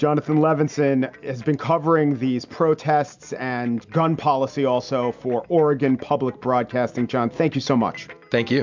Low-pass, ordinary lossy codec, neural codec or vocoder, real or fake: 7.2 kHz; MP3, 64 kbps; none; real